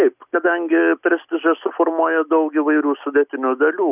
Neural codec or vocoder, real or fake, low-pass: none; real; 3.6 kHz